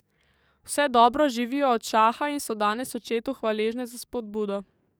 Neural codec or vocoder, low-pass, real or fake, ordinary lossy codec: codec, 44.1 kHz, 7.8 kbps, DAC; none; fake; none